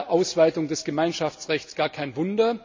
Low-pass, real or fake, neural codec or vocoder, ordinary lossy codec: 7.2 kHz; real; none; MP3, 48 kbps